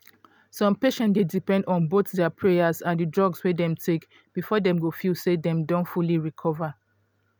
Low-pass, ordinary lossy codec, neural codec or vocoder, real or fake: none; none; none; real